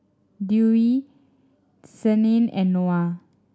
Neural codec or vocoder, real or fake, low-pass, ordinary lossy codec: none; real; none; none